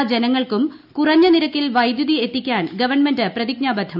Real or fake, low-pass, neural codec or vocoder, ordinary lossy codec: real; 5.4 kHz; none; none